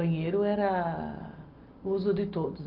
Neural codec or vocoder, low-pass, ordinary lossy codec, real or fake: none; 5.4 kHz; Opus, 32 kbps; real